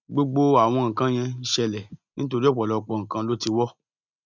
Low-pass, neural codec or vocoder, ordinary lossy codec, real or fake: 7.2 kHz; none; none; real